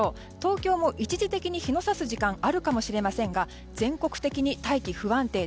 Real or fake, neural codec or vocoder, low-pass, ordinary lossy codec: real; none; none; none